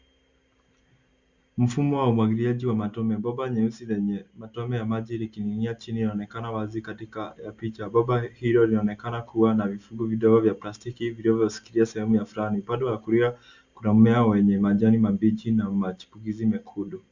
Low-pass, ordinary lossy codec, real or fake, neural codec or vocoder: 7.2 kHz; Opus, 64 kbps; real; none